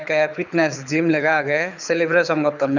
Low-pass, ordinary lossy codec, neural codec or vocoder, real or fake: 7.2 kHz; none; codec, 24 kHz, 6 kbps, HILCodec; fake